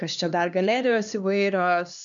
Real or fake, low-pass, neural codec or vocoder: fake; 7.2 kHz; codec, 16 kHz, 2 kbps, X-Codec, HuBERT features, trained on LibriSpeech